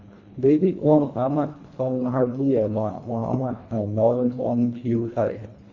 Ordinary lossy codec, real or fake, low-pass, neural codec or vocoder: Opus, 64 kbps; fake; 7.2 kHz; codec, 24 kHz, 1.5 kbps, HILCodec